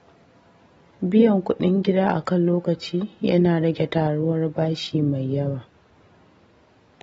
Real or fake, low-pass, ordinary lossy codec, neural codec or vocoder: real; 19.8 kHz; AAC, 24 kbps; none